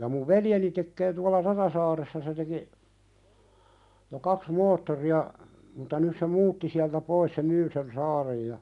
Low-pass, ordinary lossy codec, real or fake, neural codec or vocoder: 10.8 kHz; none; real; none